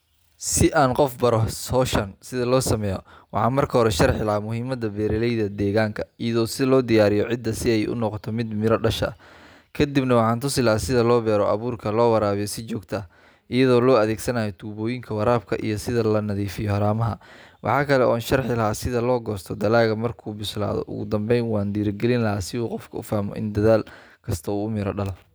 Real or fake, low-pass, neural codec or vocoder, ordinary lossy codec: real; none; none; none